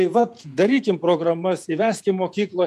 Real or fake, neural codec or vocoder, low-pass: fake; vocoder, 48 kHz, 128 mel bands, Vocos; 14.4 kHz